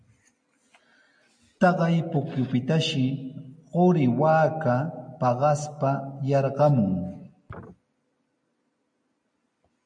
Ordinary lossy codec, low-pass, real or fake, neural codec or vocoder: MP3, 64 kbps; 9.9 kHz; real; none